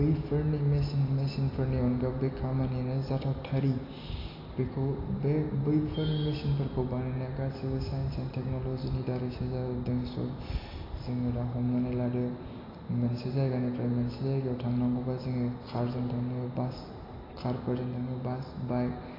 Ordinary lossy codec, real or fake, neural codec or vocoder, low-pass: AAC, 24 kbps; real; none; 5.4 kHz